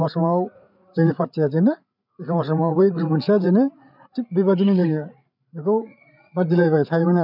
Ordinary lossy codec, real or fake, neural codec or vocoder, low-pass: none; fake; codec, 16 kHz, 8 kbps, FreqCodec, larger model; 5.4 kHz